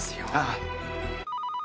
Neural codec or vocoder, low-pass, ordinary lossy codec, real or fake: none; none; none; real